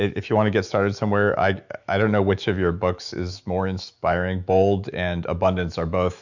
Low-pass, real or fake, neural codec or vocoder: 7.2 kHz; fake; autoencoder, 48 kHz, 128 numbers a frame, DAC-VAE, trained on Japanese speech